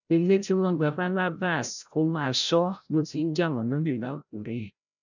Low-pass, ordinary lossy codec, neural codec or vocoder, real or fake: 7.2 kHz; none; codec, 16 kHz, 0.5 kbps, FreqCodec, larger model; fake